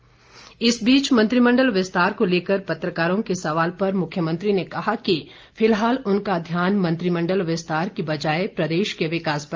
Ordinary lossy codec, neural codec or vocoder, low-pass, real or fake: Opus, 24 kbps; none; 7.2 kHz; real